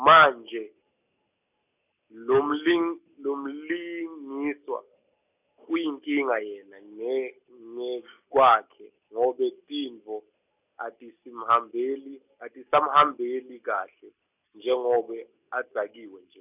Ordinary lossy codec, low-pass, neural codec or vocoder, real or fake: none; 3.6 kHz; none; real